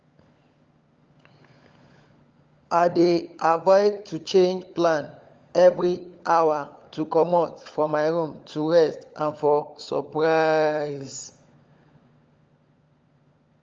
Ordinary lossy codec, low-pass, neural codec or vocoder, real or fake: Opus, 32 kbps; 7.2 kHz; codec, 16 kHz, 16 kbps, FunCodec, trained on LibriTTS, 50 frames a second; fake